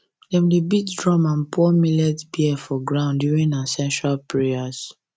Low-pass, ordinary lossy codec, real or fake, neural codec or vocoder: none; none; real; none